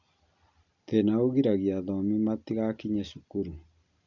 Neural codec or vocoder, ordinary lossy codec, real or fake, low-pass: none; none; real; 7.2 kHz